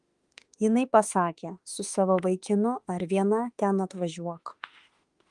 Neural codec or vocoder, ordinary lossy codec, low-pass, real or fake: autoencoder, 48 kHz, 32 numbers a frame, DAC-VAE, trained on Japanese speech; Opus, 24 kbps; 10.8 kHz; fake